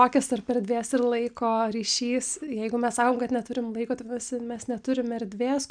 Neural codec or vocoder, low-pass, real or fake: none; 9.9 kHz; real